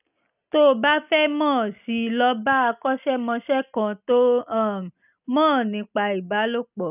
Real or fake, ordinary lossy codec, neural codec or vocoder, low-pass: real; none; none; 3.6 kHz